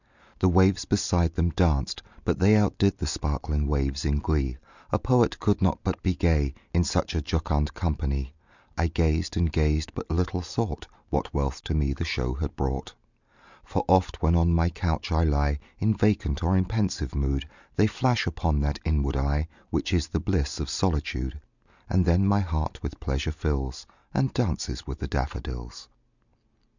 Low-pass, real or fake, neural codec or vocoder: 7.2 kHz; real; none